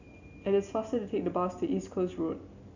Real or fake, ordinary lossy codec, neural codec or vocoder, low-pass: real; none; none; 7.2 kHz